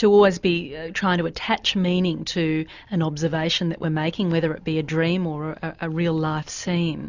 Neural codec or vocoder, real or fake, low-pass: none; real; 7.2 kHz